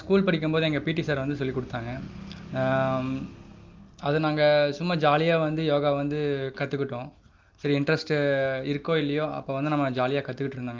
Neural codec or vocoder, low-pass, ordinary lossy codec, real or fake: none; 7.2 kHz; Opus, 24 kbps; real